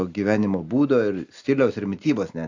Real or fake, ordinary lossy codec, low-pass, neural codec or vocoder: real; AAC, 48 kbps; 7.2 kHz; none